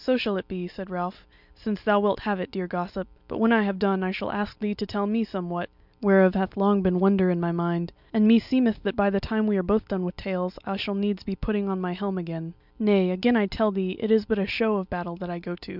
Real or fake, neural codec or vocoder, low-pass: real; none; 5.4 kHz